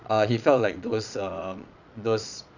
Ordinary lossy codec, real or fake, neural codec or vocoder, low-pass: none; fake; vocoder, 22.05 kHz, 80 mel bands, Vocos; 7.2 kHz